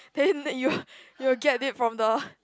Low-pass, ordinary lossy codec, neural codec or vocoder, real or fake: none; none; none; real